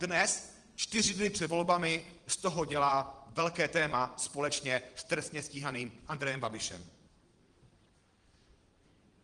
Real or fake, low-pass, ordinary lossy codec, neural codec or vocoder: fake; 9.9 kHz; Opus, 24 kbps; vocoder, 22.05 kHz, 80 mel bands, Vocos